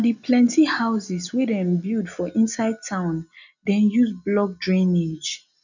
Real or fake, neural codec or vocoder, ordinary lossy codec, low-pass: real; none; none; 7.2 kHz